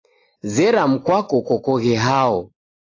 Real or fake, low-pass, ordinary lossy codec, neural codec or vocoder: real; 7.2 kHz; AAC, 32 kbps; none